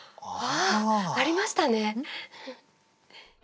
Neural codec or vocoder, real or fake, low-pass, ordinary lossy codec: none; real; none; none